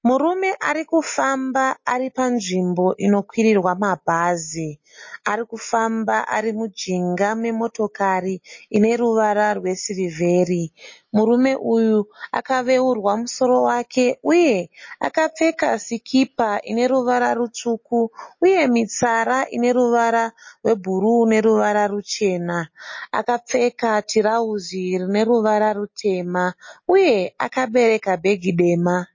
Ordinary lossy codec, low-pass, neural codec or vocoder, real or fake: MP3, 32 kbps; 7.2 kHz; none; real